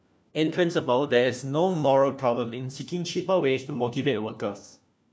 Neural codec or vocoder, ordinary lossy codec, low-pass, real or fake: codec, 16 kHz, 1 kbps, FunCodec, trained on LibriTTS, 50 frames a second; none; none; fake